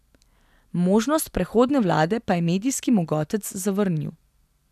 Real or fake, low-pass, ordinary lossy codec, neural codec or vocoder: real; 14.4 kHz; none; none